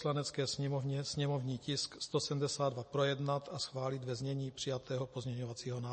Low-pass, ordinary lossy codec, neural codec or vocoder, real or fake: 10.8 kHz; MP3, 32 kbps; none; real